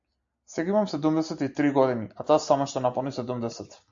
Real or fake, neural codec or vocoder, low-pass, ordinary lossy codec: real; none; 7.2 kHz; AAC, 64 kbps